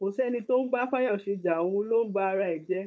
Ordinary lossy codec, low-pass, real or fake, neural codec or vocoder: none; none; fake; codec, 16 kHz, 4.8 kbps, FACodec